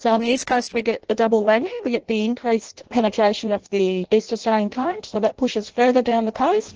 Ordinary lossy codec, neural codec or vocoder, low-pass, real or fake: Opus, 16 kbps; codec, 16 kHz in and 24 kHz out, 0.6 kbps, FireRedTTS-2 codec; 7.2 kHz; fake